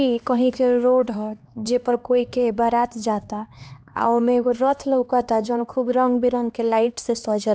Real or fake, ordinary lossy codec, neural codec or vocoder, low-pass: fake; none; codec, 16 kHz, 2 kbps, X-Codec, HuBERT features, trained on LibriSpeech; none